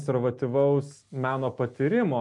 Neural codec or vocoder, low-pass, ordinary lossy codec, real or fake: none; 10.8 kHz; MP3, 64 kbps; real